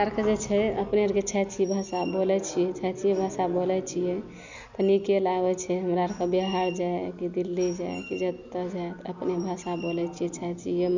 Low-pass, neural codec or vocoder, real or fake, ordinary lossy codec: 7.2 kHz; none; real; none